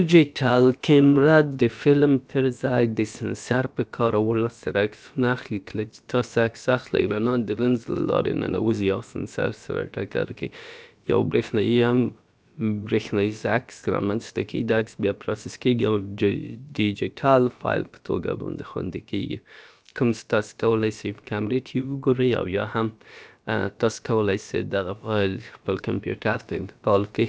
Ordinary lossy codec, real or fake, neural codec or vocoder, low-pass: none; fake; codec, 16 kHz, about 1 kbps, DyCAST, with the encoder's durations; none